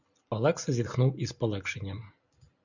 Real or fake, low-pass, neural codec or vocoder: real; 7.2 kHz; none